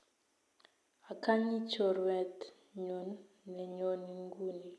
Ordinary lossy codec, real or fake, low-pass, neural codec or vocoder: none; real; none; none